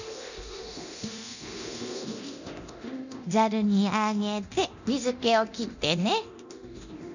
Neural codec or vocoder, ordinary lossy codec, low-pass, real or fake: codec, 24 kHz, 0.9 kbps, DualCodec; none; 7.2 kHz; fake